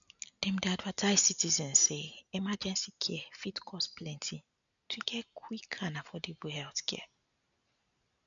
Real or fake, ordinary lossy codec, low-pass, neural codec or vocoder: real; none; 7.2 kHz; none